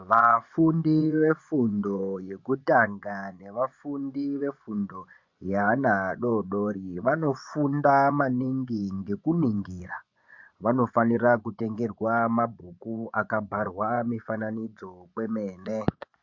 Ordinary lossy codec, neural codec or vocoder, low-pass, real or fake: MP3, 48 kbps; vocoder, 24 kHz, 100 mel bands, Vocos; 7.2 kHz; fake